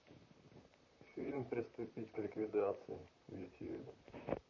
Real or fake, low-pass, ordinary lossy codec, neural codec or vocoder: fake; 7.2 kHz; MP3, 32 kbps; vocoder, 44.1 kHz, 128 mel bands, Pupu-Vocoder